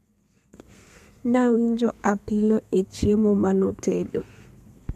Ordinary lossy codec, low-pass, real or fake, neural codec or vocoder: MP3, 96 kbps; 14.4 kHz; fake; codec, 32 kHz, 1.9 kbps, SNAC